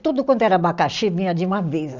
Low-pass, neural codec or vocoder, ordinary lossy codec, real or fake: 7.2 kHz; none; none; real